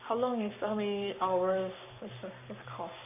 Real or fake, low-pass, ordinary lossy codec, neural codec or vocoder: fake; 3.6 kHz; AAC, 32 kbps; codec, 44.1 kHz, 7.8 kbps, Pupu-Codec